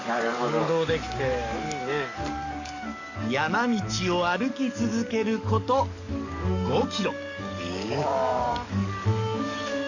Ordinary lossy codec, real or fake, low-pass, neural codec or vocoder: none; fake; 7.2 kHz; codec, 44.1 kHz, 7.8 kbps, DAC